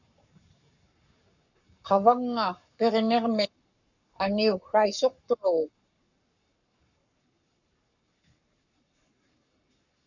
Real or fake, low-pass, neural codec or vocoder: fake; 7.2 kHz; codec, 44.1 kHz, 7.8 kbps, Pupu-Codec